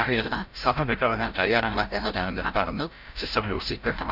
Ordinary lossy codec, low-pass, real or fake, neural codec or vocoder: none; 5.4 kHz; fake; codec, 16 kHz, 0.5 kbps, FreqCodec, larger model